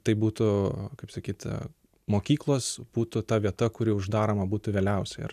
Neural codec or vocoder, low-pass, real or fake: none; 14.4 kHz; real